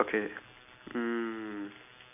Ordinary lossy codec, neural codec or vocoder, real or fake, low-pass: none; none; real; 3.6 kHz